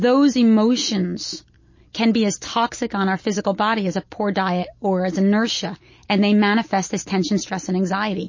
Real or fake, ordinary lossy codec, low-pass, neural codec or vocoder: real; MP3, 32 kbps; 7.2 kHz; none